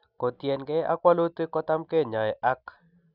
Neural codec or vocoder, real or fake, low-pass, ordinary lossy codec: none; real; 5.4 kHz; none